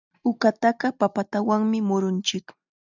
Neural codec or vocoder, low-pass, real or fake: none; 7.2 kHz; real